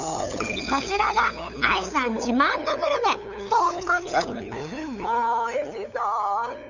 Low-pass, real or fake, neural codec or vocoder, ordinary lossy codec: 7.2 kHz; fake; codec, 16 kHz, 8 kbps, FunCodec, trained on LibriTTS, 25 frames a second; none